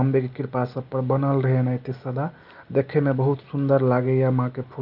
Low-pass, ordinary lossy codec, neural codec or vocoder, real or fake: 5.4 kHz; Opus, 24 kbps; none; real